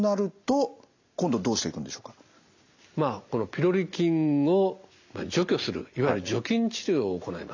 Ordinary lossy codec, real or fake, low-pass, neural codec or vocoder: AAC, 48 kbps; real; 7.2 kHz; none